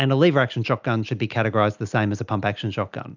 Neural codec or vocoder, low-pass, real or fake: none; 7.2 kHz; real